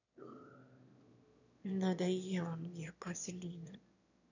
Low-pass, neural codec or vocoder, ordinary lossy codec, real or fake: 7.2 kHz; autoencoder, 22.05 kHz, a latent of 192 numbers a frame, VITS, trained on one speaker; none; fake